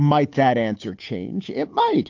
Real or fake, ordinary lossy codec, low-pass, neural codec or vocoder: fake; AAC, 48 kbps; 7.2 kHz; codec, 16 kHz, 8 kbps, FunCodec, trained on Chinese and English, 25 frames a second